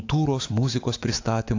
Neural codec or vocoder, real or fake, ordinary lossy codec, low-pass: autoencoder, 48 kHz, 128 numbers a frame, DAC-VAE, trained on Japanese speech; fake; AAC, 48 kbps; 7.2 kHz